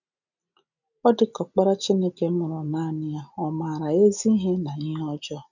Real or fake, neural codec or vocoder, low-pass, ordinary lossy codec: real; none; 7.2 kHz; none